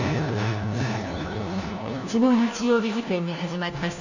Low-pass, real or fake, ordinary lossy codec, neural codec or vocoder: 7.2 kHz; fake; none; codec, 16 kHz, 1 kbps, FunCodec, trained on LibriTTS, 50 frames a second